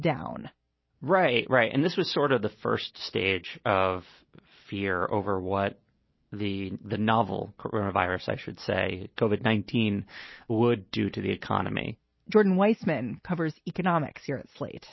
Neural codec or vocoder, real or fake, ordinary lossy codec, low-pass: none; real; MP3, 24 kbps; 7.2 kHz